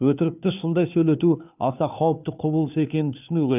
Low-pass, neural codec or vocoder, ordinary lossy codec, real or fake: 3.6 kHz; codec, 16 kHz, 2 kbps, FunCodec, trained on Chinese and English, 25 frames a second; none; fake